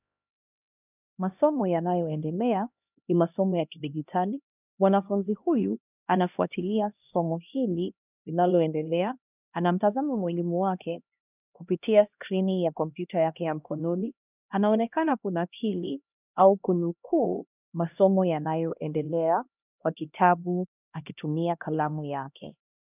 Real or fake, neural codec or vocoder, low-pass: fake; codec, 16 kHz, 1 kbps, X-Codec, HuBERT features, trained on LibriSpeech; 3.6 kHz